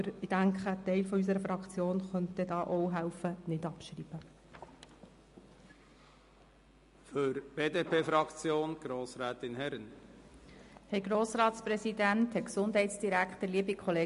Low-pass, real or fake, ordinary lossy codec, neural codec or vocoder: 10.8 kHz; real; MP3, 48 kbps; none